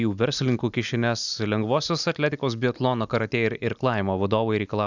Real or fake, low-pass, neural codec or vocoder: real; 7.2 kHz; none